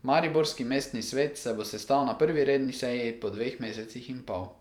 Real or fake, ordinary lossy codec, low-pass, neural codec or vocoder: fake; none; 19.8 kHz; vocoder, 44.1 kHz, 128 mel bands every 512 samples, BigVGAN v2